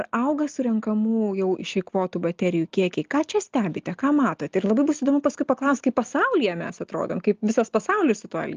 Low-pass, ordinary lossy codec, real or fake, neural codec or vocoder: 7.2 kHz; Opus, 32 kbps; real; none